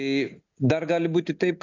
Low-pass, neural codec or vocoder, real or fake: 7.2 kHz; none; real